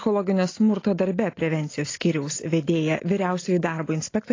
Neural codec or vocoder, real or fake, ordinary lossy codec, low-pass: none; real; AAC, 32 kbps; 7.2 kHz